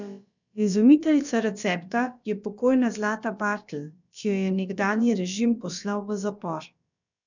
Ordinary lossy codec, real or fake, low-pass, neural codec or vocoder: none; fake; 7.2 kHz; codec, 16 kHz, about 1 kbps, DyCAST, with the encoder's durations